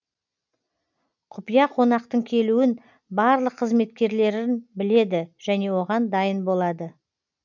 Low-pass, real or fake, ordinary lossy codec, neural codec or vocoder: 7.2 kHz; real; none; none